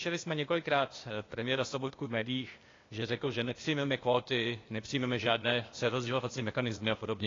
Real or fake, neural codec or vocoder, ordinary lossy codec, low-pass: fake; codec, 16 kHz, 0.8 kbps, ZipCodec; AAC, 32 kbps; 7.2 kHz